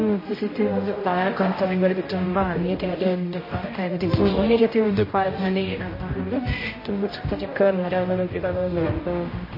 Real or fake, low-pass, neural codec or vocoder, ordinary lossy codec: fake; 5.4 kHz; codec, 16 kHz, 0.5 kbps, X-Codec, HuBERT features, trained on general audio; MP3, 24 kbps